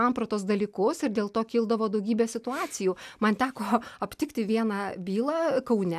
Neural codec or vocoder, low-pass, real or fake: none; 14.4 kHz; real